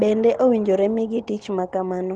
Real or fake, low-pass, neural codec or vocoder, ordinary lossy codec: real; 10.8 kHz; none; Opus, 16 kbps